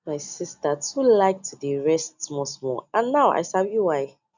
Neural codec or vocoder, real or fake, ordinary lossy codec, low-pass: none; real; none; 7.2 kHz